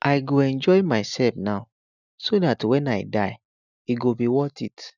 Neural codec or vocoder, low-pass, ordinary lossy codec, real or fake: none; 7.2 kHz; none; real